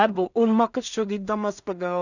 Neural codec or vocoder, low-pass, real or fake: codec, 16 kHz in and 24 kHz out, 0.4 kbps, LongCat-Audio-Codec, two codebook decoder; 7.2 kHz; fake